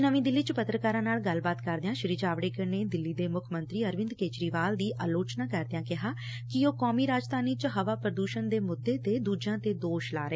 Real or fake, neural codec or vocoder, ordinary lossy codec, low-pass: real; none; none; none